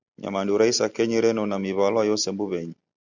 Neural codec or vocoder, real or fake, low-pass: none; real; 7.2 kHz